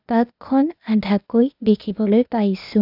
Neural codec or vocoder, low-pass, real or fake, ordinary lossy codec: codec, 16 kHz, 0.8 kbps, ZipCodec; 5.4 kHz; fake; none